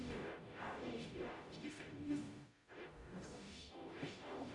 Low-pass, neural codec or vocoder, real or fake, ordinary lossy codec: 10.8 kHz; codec, 44.1 kHz, 0.9 kbps, DAC; fake; MP3, 96 kbps